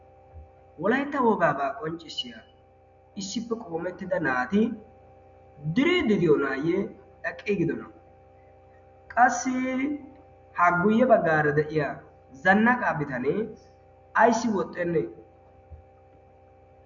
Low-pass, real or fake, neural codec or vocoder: 7.2 kHz; real; none